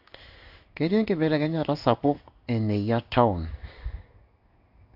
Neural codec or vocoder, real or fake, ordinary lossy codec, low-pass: codec, 16 kHz in and 24 kHz out, 1 kbps, XY-Tokenizer; fake; AAC, 48 kbps; 5.4 kHz